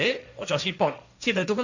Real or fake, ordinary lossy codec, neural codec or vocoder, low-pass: fake; none; codec, 16 kHz, 1.1 kbps, Voila-Tokenizer; 7.2 kHz